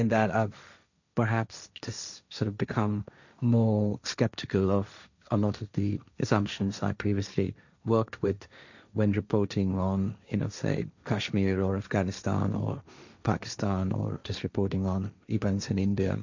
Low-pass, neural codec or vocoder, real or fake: 7.2 kHz; codec, 16 kHz, 1.1 kbps, Voila-Tokenizer; fake